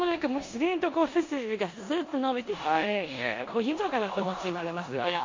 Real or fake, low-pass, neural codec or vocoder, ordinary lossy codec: fake; 7.2 kHz; codec, 16 kHz in and 24 kHz out, 0.9 kbps, LongCat-Audio-Codec, four codebook decoder; MP3, 48 kbps